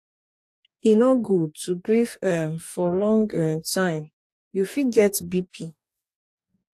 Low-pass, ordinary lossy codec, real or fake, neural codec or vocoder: 14.4 kHz; AAC, 64 kbps; fake; codec, 44.1 kHz, 2.6 kbps, DAC